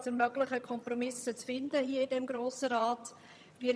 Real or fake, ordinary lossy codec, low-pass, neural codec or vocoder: fake; none; none; vocoder, 22.05 kHz, 80 mel bands, HiFi-GAN